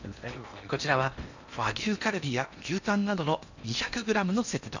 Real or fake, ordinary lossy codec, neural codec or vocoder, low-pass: fake; none; codec, 16 kHz in and 24 kHz out, 0.8 kbps, FocalCodec, streaming, 65536 codes; 7.2 kHz